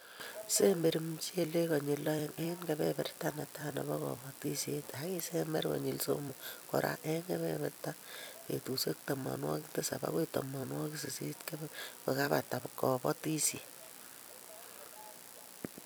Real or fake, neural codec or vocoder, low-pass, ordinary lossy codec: fake; vocoder, 44.1 kHz, 128 mel bands every 256 samples, BigVGAN v2; none; none